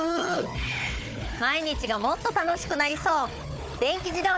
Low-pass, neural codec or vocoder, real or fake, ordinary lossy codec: none; codec, 16 kHz, 4 kbps, FunCodec, trained on Chinese and English, 50 frames a second; fake; none